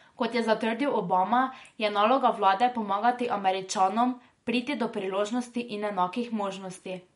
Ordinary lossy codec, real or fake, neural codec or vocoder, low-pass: MP3, 48 kbps; real; none; 10.8 kHz